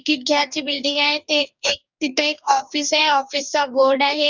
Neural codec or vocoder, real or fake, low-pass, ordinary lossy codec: codec, 44.1 kHz, 2.6 kbps, DAC; fake; 7.2 kHz; none